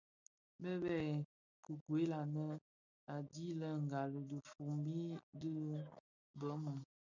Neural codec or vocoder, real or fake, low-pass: none; real; 7.2 kHz